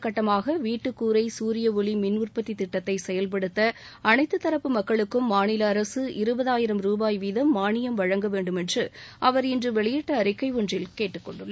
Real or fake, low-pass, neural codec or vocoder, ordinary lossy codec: real; none; none; none